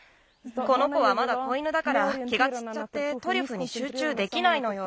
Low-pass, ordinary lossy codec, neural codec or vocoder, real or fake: none; none; none; real